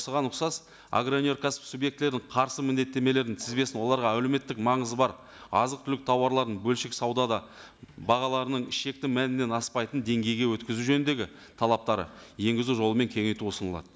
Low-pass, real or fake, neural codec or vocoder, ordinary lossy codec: none; real; none; none